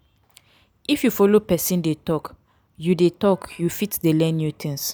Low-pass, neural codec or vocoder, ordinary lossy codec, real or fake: none; none; none; real